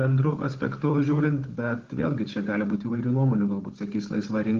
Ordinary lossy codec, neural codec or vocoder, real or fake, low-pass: Opus, 16 kbps; codec, 16 kHz, 16 kbps, FunCodec, trained on Chinese and English, 50 frames a second; fake; 7.2 kHz